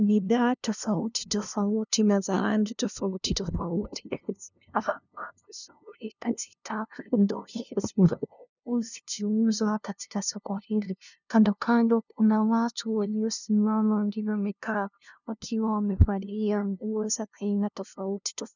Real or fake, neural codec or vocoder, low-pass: fake; codec, 16 kHz, 0.5 kbps, FunCodec, trained on LibriTTS, 25 frames a second; 7.2 kHz